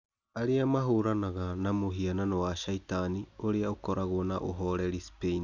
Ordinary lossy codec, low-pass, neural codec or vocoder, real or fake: none; 7.2 kHz; none; real